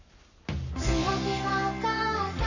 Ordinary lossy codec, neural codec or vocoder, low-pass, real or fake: none; codec, 44.1 kHz, 7.8 kbps, Pupu-Codec; 7.2 kHz; fake